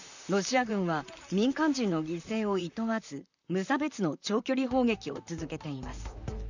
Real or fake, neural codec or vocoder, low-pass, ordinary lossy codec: fake; vocoder, 44.1 kHz, 128 mel bands, Pupu-Vocoder; 7.2 kHz; none